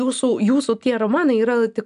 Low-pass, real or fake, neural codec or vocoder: 10.8 kHz; real; none